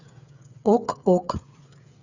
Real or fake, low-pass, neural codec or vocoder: fake; 7.2 kHz; codec, 16 kHz, 8 kbps, FreqCodec, smaller model